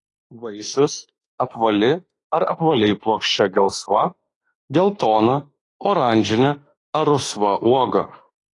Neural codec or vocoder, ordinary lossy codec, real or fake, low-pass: autoencoder, 48 kHz, 32 numbers a frame, DAC-VAE, trained on Japanese speech; AAC, 32 kbps; fake; 10.8 kHz